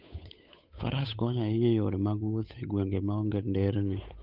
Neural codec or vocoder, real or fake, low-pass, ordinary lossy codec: codec, 16 kHz, 8 kbps, FunCodec, trained on Chinese and English, 25 frames a second; fake; 5.4 kHz; none